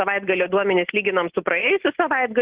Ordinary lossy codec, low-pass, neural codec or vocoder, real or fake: Opus, 16 kbps; 3.6 kHz; none; real